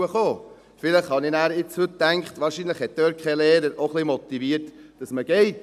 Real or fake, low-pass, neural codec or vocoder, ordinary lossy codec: real; 14.4 kHz; none; none